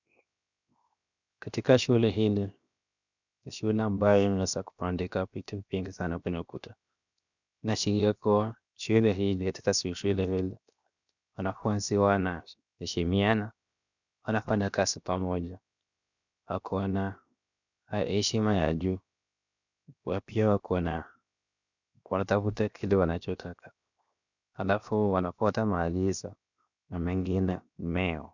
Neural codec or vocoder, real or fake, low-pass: codec, 16 kHz, 0.7 kbps, FocalCodec; fake; 7.2 kHz